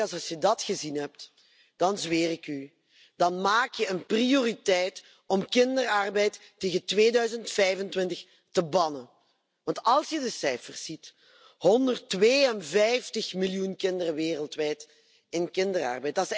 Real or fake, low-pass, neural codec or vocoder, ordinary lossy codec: real; none; none; none